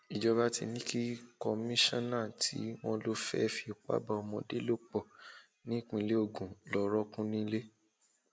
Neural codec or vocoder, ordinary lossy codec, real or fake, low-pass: none; none; real; none